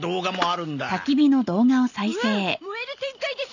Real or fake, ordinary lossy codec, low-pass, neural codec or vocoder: real; none; 7.2 kHz; none